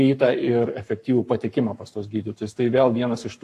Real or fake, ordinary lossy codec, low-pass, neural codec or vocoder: fake; AAC, 64 kbps; 14.4 kHz; codec, 44.1 kHz, 7.8 kbps, Pupu-Codec